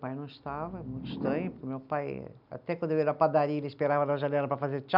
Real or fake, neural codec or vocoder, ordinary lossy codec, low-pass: real; none; none; 5.4 kHz